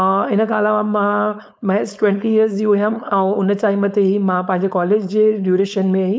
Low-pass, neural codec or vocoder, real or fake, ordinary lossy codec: none; codec, 16 kHz, 4.8 kbps, FACodec; fake; none